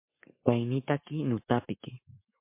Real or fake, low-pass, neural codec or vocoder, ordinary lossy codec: fake; 3.6 kHz; codec, 44.1 kHz, 7.8 kbps, Pupu-Codec; MP3, 24 kbps